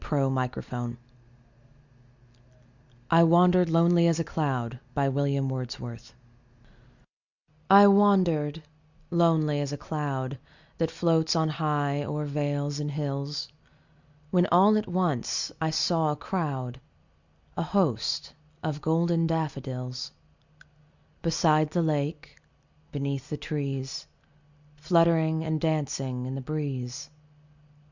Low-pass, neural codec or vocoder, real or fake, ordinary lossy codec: 7.2 kHz; none; real; Opus, 64 kbps